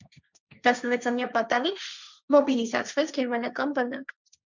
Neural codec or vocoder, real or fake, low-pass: codec, 16 kHz, 1.1 kbps, Voila-Tokenizer; fake; 7.2 kHz